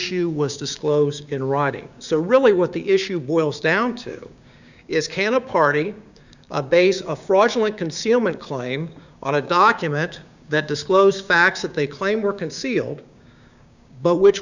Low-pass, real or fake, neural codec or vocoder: 7.2 kHz; fake; codec, 16 kHz, 6 kbps, DAC